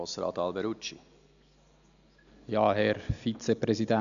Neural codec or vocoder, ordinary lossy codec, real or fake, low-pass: none; none; real; 7.2 kHz